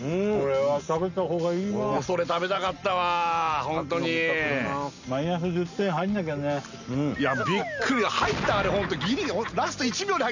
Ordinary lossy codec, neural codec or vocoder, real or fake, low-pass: none; none; real; 7.2 kHz